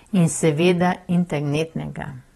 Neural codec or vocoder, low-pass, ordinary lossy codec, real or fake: vocoder, 44.1 kHz, 128 mel bands every 512 samples, BigVGAN v2; 19.8 kHz; AAC, 32 kbps; fake